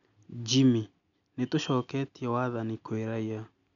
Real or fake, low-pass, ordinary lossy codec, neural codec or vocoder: real; 7.2 kHz; none; none